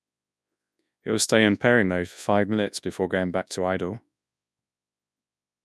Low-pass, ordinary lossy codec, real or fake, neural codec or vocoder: none; none; fake; codec, 24 kHz, 0.9 kbps, WavTokenizer, large speech release